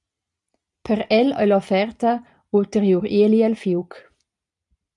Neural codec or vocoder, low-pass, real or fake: none; 10.8 kHz; real